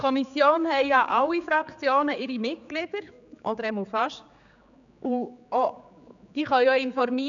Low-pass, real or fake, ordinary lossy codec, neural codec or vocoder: 7.2 kHz; fake; none; codec, 16 kHz, 4 kbps, X-Codec, HuBERT features, trained on general audio